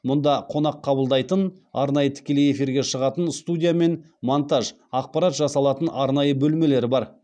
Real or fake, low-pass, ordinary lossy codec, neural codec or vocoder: real; 9.9 kHz; none; none